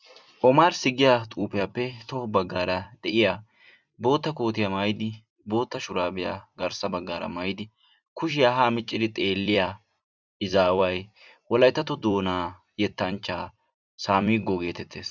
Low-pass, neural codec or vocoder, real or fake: 7.2 kHz; vocoder, 44.1 kHz, 128 mel bands every 256 samples, BigVGAN v2; fake